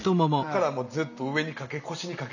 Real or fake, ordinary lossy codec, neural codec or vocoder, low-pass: real; none; none; 7.2 kHz